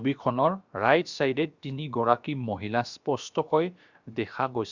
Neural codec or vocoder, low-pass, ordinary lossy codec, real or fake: codec, 16 kHz, about 1 kbps, DyCAST, with the encoder's durations; 7.2 kHz; Opus, 64 kbps; fake